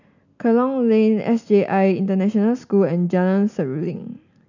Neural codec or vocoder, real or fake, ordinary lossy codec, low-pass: none; real; none; 7.2 kHz